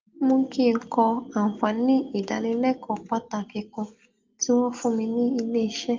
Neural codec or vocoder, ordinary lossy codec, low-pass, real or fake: none; Opus, 32 kbps; 7.2 kHz; real